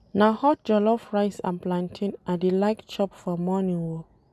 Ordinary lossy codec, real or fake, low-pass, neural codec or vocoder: none; real; none; none